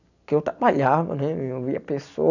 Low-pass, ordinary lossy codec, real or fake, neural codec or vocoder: 7.2 kHz; none; real; none